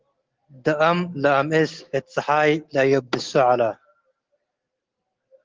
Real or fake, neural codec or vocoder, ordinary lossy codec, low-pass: real; none; Opus, 16 kbps; 7.2 kHz